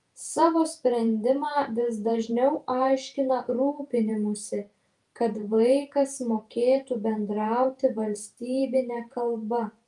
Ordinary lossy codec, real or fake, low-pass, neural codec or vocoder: Opus, 32 kbps; fake; 10.8 kHz; vocoder, 48 kHz, 128 mel bands, Vocos